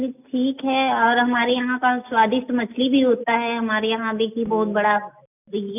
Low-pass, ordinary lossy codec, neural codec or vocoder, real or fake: 3.6 kHz; none; none; real